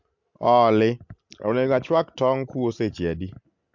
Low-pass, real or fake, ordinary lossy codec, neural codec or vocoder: 7.2 kHz; real; MP3, 64 kbps; none